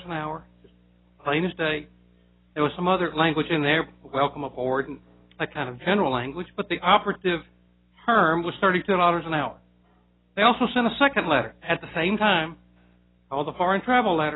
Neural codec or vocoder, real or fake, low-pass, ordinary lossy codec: none; real; 7.2 kHz; AAC, 16 kbps